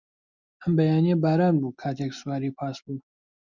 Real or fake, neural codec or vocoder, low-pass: real; none; 7.2 kHz